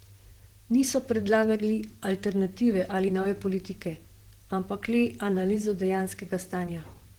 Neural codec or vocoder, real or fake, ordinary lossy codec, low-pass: vocoder, 44.1 kHz, 128 mel bands, Pupu-Vocoder; fake; Opus, 16 kbps; 19.8 kHz